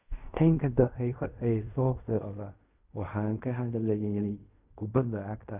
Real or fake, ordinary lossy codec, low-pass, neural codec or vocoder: fake; none; 3.6 kHz; codec, 16 kHz in and 24 kHz out, 0.4 kbps, LongCat-Audio-Codec, fine tuned four codebook decoder